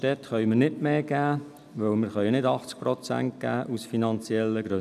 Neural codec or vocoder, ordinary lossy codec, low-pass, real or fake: none; none; 14.4 kHz; real